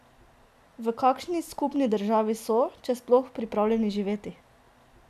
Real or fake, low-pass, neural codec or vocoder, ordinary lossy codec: real; 14.4 kHz; none; none